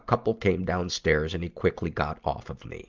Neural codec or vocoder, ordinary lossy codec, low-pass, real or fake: none; Opus, 32 kbps; 7.2 kHz; real